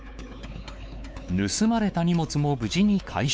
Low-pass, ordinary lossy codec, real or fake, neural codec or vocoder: none; none; fake; codec, 16 kHz, 4 kbps, X-Codec, WavLM features, trained on Multilingual LibriSpeech